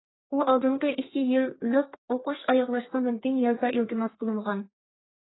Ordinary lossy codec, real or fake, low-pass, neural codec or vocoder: AAC, 16 kbps; fake; 7.2 kHz; codec, 32 kHz, 1.9 kbps, SNAC